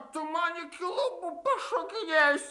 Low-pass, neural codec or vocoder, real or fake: 10.8 kHz; none; real